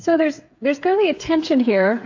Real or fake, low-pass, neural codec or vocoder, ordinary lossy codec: fake; 7.2 kHz; codec, 16 kHz, 8 kbps, FreqCodec, smaller model; AAC, 48 kbps